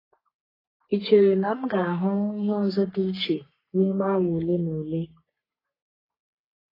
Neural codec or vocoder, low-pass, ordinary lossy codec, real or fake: codec, 44.1 kHz, 3.4 kbps, Pupu-Codec; 5.4 kHz; AAC, 24 kbps; fake